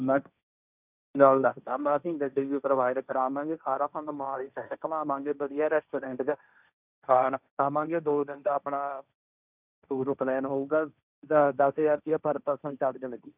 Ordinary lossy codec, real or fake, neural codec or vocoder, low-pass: none; fake; codec, 16 kHz, 1.1 kbps, Voila-Tokenizer; 3.6 kHz